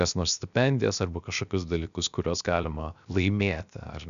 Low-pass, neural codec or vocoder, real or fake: 7.2 kHz; codec, 16 kHz, 0.7 kbps, FocalCodec; fake